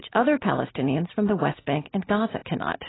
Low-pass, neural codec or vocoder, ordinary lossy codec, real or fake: 7.2 kHz; none; AAC, 16 kbps; real